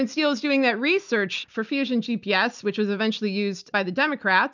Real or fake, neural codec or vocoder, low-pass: real; none; 7.2 kHz